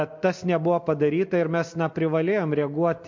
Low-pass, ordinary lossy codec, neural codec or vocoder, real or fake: 7.2 kHz; MP3, 48 kbps; none; real